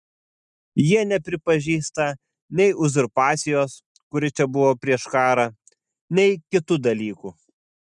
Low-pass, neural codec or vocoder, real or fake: 10.8 kHz; none; real